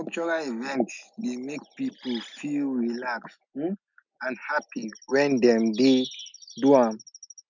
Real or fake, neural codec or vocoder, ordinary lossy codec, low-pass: real; none; none; 7.2 kHz